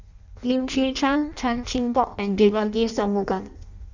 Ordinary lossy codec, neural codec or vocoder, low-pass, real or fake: none; codec, 16 kHz in and 24 kHz out, 0.6 kbps, FireRedTTS-2 codec; 7.2 kHz; fake